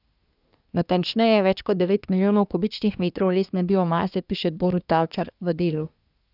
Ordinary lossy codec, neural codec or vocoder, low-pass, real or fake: none; codec, 24 kHz, 1 kbps, SNAC; 5.4 kHz; fake